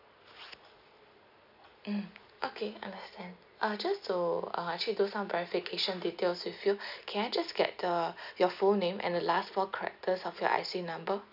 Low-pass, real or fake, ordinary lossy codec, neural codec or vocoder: 5.4 kHz; real; none; none